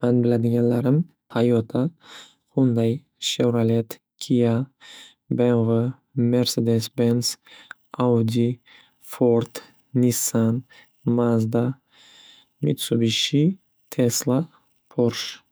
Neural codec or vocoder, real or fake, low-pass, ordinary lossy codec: autoencoder, 48 kHz, 128 numbers a frame, DAC-VAE, trained on Japanese speech; fake; none; none